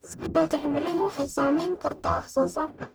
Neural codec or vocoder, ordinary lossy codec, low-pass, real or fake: codec, 44.1 kHz, 0.9 kbps, DAC; none; none; fake